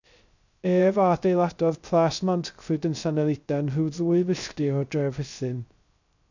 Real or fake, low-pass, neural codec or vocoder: fake; 7.2 kHz; codec, 16 kHz, 0.3 kbps, FocalCodec